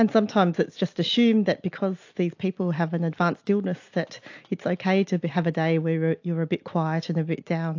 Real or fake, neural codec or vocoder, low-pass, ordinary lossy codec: real; none; 7.2 kHz; AAC, 48 kbps